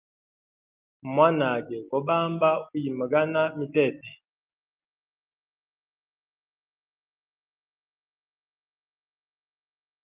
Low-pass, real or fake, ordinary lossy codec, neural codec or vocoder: 3.6 kHz; real; Opus, 32 kbps; none